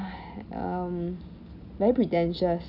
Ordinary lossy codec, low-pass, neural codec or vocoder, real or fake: none; 5.4 kHz; none; real